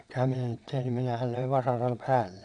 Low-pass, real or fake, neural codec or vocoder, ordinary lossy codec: 9.9 kHz; fake; vocoder, 22.05 kHz, 80 mel bands, WaveNeXt; none